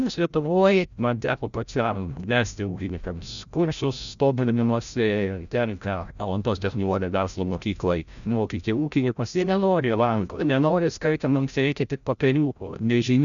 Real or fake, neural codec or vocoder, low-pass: fake; codec, 16 kHz, 0.5 kbps, FreqCodec, larger model; 7.2 kHz